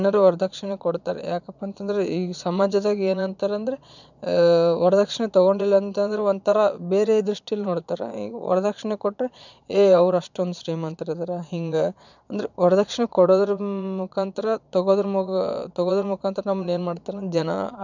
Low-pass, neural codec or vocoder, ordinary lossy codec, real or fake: 7.2 kHz; vocoder, 22.05 kHz, 80 mel bands, Vocos; none; fake